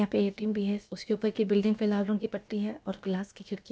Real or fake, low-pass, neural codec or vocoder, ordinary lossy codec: fake; none; codec, 16 kHz, about 1 kbps, DyCAST, with the encoder's durations; none